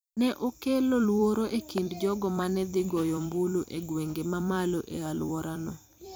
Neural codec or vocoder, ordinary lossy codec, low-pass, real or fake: vocoder, 44.1 kHz, 128 mel bands every 256 samples, BigVGAN v2; none; none; fake